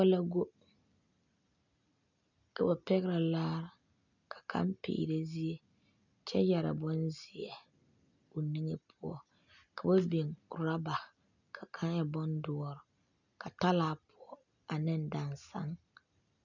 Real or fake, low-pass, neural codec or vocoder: real; 7.2 kHz; none